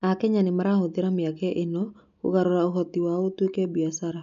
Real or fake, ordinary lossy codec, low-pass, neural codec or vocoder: real; none; 7.2 kHz; none